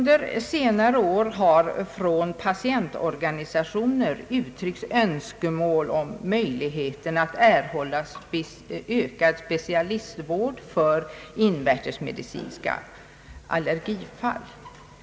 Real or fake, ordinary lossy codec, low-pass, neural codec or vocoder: real; none; none; none